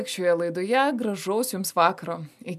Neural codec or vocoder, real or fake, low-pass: none; real; 14.4 kHz